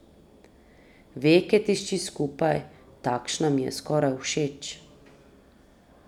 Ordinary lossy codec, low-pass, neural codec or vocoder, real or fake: none; 19.8 kHz; none; real